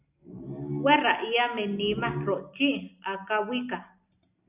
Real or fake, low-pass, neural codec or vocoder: real; 3.6 kHz; none